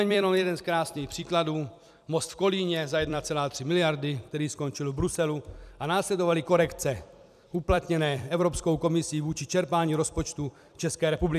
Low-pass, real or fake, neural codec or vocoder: 14.4 kHz; fake; vocoder, 44.1 kHz, 128 mel bands every 256 samples, BigVGAN v2